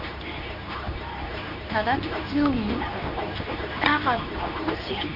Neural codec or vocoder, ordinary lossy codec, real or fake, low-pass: codec, 24 kHz, 0.9 kbps, WavTokenizer, medium speech release version 2; none; fake; 5.4 kHz